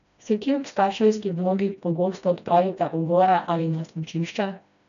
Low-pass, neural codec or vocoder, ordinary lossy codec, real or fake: 7.2 kHz; codec, 16 kHz, 1 kbps, FreqCodec, smaller model; none; fake